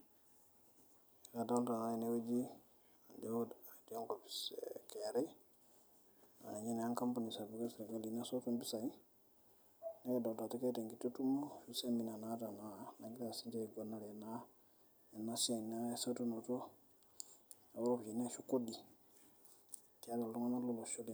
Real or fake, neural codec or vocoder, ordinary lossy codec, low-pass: real; none; none; none